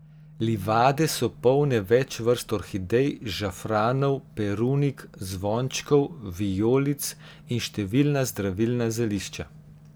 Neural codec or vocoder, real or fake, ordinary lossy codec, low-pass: none; real; none; none